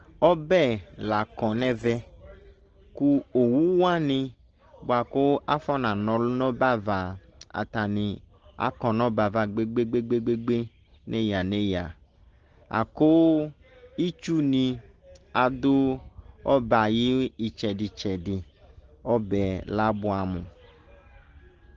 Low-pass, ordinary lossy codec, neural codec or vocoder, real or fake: 7.2 kHz; Opus, 16 kbps; none; real